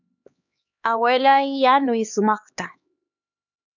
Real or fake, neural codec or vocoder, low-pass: fake; codec, 16 kHz, 2 kbps, X-Codec, HuBERT features, trained on LibriSpeech; 7.2 kHz